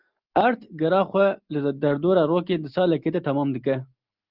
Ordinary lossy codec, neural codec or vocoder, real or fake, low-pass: Opus, 32 kbps; none; real; 5.4 kHz